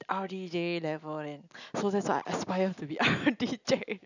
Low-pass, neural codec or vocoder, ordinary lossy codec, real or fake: 7.2 kHz; none; none; real